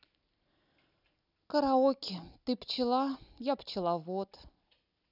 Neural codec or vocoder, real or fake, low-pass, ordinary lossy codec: none; real; 5.4 kHz; none